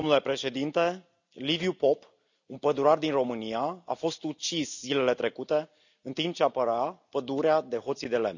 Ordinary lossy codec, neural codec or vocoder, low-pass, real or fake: none; none; 7.2 kHz; real